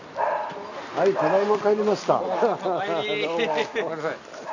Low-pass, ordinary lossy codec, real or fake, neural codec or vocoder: 7.2 kHz; none; real; none